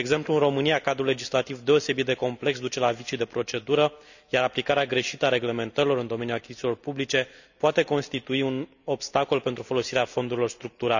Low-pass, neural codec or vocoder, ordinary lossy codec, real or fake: 7.2 kHz; none; none; real